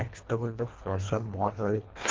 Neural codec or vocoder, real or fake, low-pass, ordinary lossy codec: codec, 24 kHz, 1.5 kbps, HILCodec; fake; 7.2 kHz; Opus, 16 kbps